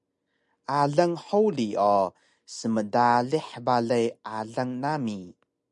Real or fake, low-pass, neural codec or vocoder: real; 10.8 kHz; none